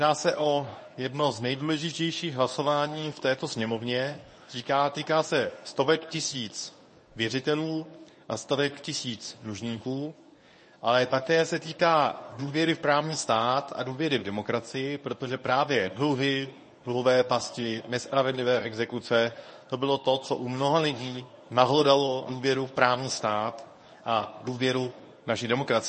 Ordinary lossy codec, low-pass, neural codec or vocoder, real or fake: MP3, 32 kbps; 10.8 kHz; codec, 24 kHz, 0.9 kbps, WavTokenizer, medium speech release version 1; fake